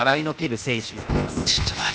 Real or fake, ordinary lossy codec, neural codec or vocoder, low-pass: fake; none; codec, 16 kHz, 0.8 kbps, ZipCodec; none